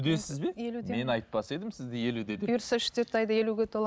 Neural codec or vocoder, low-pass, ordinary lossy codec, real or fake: none; none; none; real